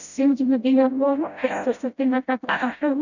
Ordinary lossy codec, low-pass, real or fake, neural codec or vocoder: none; 7.2 kHz; fake; codec, 16 kHz, 0.5 kbps, FreqCodec, smaller model